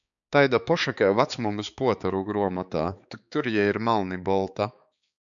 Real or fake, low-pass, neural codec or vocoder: fake; 7.2 kHz; codec, 16 kHz, 4 kbps, X-Codec, HuBERT features, trained on balanced general audio